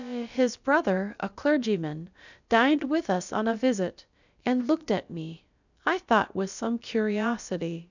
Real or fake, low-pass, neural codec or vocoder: fake; 7.2 kHz; codec, 16 kHz, about 1 kbps, DyCAST, with the encoder's durations